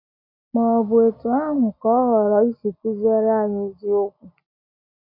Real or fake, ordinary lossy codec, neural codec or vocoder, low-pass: real; none; none; 5.4 kHz